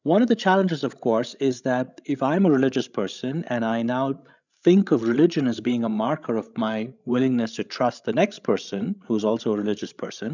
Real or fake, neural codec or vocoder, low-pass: fake; codec, 16 kHz, 16 kbps, FreqCodec, larger model; 7.2 kHz